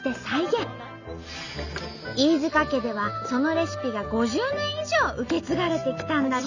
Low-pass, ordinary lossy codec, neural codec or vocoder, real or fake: 7.2 kHz; none; none; real